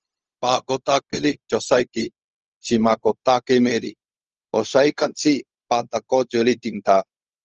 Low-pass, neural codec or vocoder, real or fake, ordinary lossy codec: 7.2 kHz; codec, 16 kHz, 0.4 kbps, LongCat-Audio-Codec; fake; Opus, 24 kbps